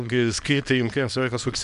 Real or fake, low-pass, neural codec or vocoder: fake; 10.8 kHz; codec, 24 kHz, 0.9 kbps, WavTokenizer, small release